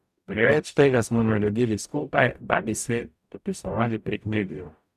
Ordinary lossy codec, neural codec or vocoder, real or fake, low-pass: none; codec, 44.1 kHz, 0.9 kbps, DAC; fake; 14.4 kHz